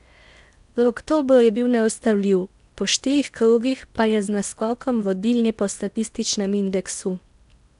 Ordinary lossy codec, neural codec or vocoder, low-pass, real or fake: none; codec, 16 kHz in and 24 kHz out, 0.8 kbps, FocalCodec, streaming, 65536 codes; 10.8 kHz; fake